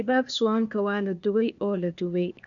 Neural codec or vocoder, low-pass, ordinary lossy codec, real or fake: codec, 16 kHz, 0.8 kbps, ZipCodec; 7.2 kHz; none; fake